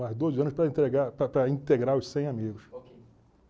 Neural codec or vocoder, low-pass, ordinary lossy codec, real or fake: none; none; none; real